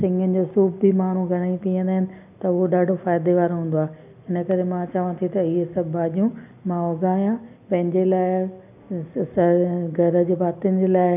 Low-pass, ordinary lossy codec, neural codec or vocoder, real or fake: 3.6 kHz; none; none; real